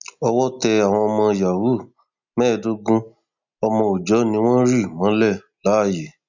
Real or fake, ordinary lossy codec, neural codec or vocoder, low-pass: real; none; none; 7.2 kHz